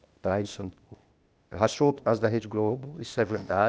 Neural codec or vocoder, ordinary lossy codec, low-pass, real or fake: codec, 16 kHz, 0.8 kbps, ZipCodec; none; none; fake